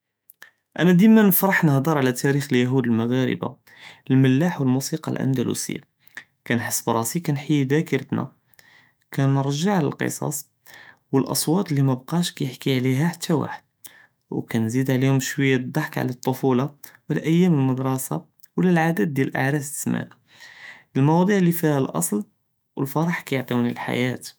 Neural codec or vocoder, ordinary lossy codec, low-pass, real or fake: autoencoder, 48 kHz, 128 numbers a frame, DAC-VAE, trained on Japanese speech; none; none; fake